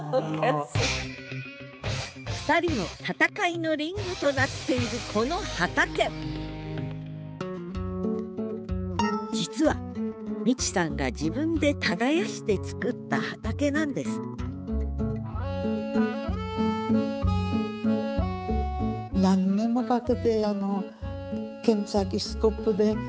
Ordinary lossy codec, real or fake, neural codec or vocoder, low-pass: none; fake; codec, 16 kHz, 4 kbps, X-Codec, HuBERT features, trained on balanced general audio; none